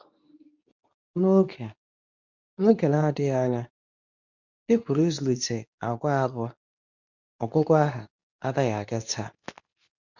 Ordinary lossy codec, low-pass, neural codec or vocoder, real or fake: none; 7.2 kHz; codec, 24 kHz, 0.9 kbps, WavTokenizer, medium speech release version 2; fake